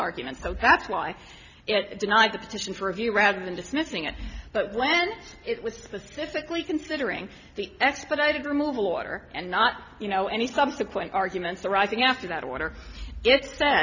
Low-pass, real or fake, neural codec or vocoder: 7.2 kHz; real; none